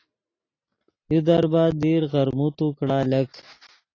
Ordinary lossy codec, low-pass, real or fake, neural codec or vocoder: AAC, 32 kbps; 7.2 kHz; real; none